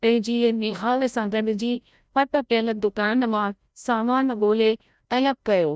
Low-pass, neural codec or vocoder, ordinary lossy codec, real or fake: none; codec, 16 kHz, 0.5 kbps, FreqCodec, larger model; none; fake